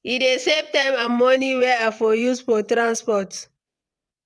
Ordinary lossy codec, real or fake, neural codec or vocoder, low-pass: none; fake; vocoder, 22.05 kHz, 80 mel bands, WaveNeXt; none